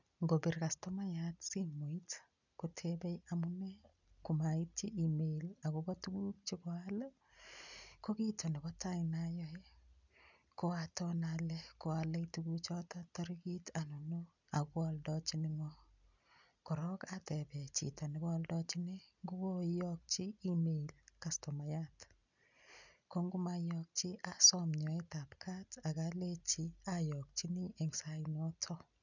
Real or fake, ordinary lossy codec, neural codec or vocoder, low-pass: real; none; none; 7.2 kHz